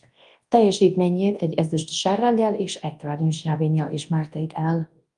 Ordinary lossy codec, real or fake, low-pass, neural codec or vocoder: Opus, 32 kbps; fake; 10.8 kHz; codec, 24 kHz, 0.9 kbps, WavTokenizer, large speech release